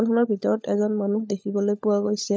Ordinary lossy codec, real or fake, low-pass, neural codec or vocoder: none; fake; none; codec, 16 kHz, 16 kbps, FunCodec, trained on LibriTTS, 50 frames a second